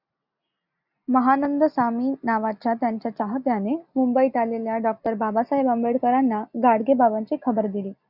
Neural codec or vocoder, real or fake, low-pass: none; real; 5.4 kHz